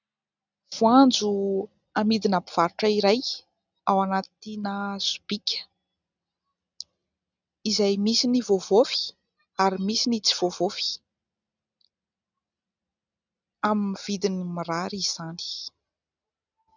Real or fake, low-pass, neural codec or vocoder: real; 7.2 kHz; none